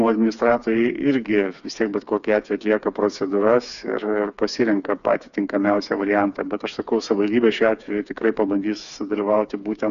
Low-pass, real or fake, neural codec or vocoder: 7.2 kHz; fake; codec, 16 kHz, 4 kbps, FreqCodec, smaller model